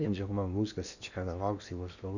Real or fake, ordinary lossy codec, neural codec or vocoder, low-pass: fake; none; codec, 16 kHz in and 24 kHz out, 0.8 kbps, FocalCodec, streaming, 65536 codes; 7.2 kHz